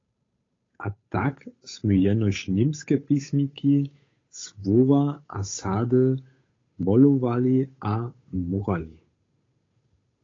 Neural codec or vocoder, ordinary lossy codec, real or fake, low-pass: codec, 16 kHz, 8 kbps, FunCodec, trained on Chinese and English, 25 frames a second; AAC, 32 kbps; fake; 7.2 kHz